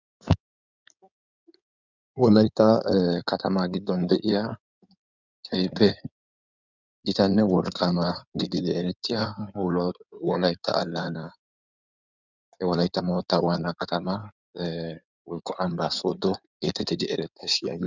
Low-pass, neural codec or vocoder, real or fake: 7.2 kHz; codec, 16 kHz in and 24 kHz out, 2.2 kbps, FireRedTTS-2 codec; fake